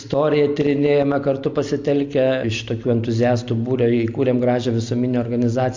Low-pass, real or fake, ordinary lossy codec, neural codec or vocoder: 7.2 kHz; real; MP3, 48 kbps; none